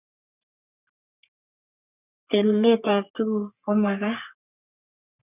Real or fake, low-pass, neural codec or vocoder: fake; 3.6 kHz; codec, 44.1 kHz, 3.4 kbps, Pupu-Codec